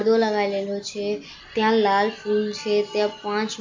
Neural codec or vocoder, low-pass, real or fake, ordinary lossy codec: none; 7.2 kHz; real; MP3, 64 kbps